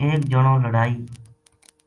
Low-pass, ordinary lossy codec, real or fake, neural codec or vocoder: 10.8 kHz; Opus, 32 kbps; real; none